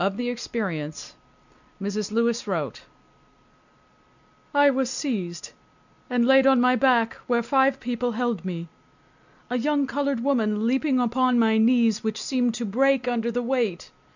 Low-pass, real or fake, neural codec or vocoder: 7.2 kHz; real; none